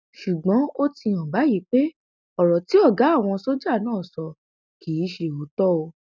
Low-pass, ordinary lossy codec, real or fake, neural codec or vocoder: none; none; real; none